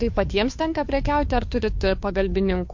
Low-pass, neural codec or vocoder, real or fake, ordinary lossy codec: 7.2 kHz; codec, 16 kHz, 2 kbps, FunCodec, trained on Chinese and English, 25 frames a second; fake; MP3, 48 kbps